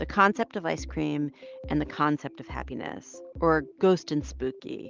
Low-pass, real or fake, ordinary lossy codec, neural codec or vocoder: 7.2 kHz; real; Opus, 32 kbps; none